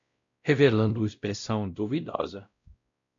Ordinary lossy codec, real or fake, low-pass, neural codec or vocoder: MP3, 64 kbps; fake; 7.2 kHz; codec, 16 kHz, 0.5 kbps, X-Codec, WavLM features, trained on Multilingual LibriSpeech